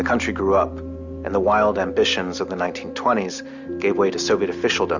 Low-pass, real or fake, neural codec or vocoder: 7.2 kHz; real; none